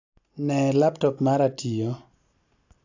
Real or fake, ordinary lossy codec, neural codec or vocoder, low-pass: real; AAC, 48 kbps; none; 7.2 kHz